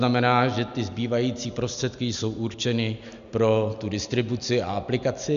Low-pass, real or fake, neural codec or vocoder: 7.2 kHz; real; none